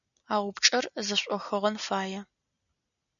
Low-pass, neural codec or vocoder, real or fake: 7.2 kHz; none; real